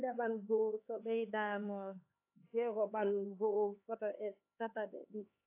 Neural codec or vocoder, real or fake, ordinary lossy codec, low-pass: codec, 16 kHz, 4 kbps, X-Codec, HuBERT features, trained on LibriSpeech; fake; AAC, 24 kbps; 3.6 kHz